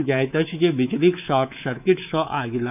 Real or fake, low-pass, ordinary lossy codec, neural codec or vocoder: fake; 3.6 kHz; none; codec, 24 kHz, 3.1 kbps, DualCodec